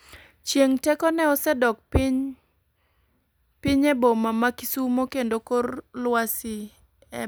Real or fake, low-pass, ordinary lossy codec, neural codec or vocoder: real; none; none; none